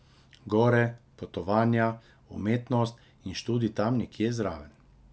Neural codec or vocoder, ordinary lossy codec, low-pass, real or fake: none; none; none; real